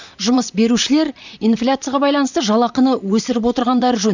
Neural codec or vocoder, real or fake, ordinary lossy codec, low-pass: vocoder, 44.1 kHz, 128 mel bands, Pupu-Vocoder; fake; none; 7.2 kHz